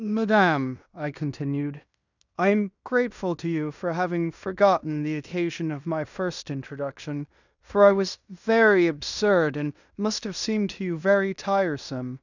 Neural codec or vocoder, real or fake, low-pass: codec, 16 kHz in and 24 kHz out, 0.9 kbps, LongCat-Audio-Codec, fine tuned four codebook decoder; fake; 7.2 kHz